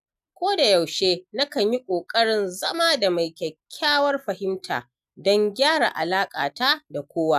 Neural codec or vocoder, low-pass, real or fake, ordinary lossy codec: none; 14.4 kHz; real; none